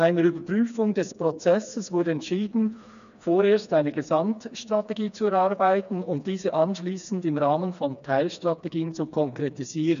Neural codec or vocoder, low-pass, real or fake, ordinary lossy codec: codec, 16 kHz, 2 kbps, FreqCodec, smaller model; 7.2 kHz; fake; none